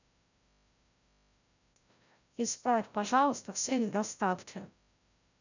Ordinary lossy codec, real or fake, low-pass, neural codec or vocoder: none; fake; 7.2 kHz; codec, 16 kHz, 0.5 kbps, FreqCodec, larger model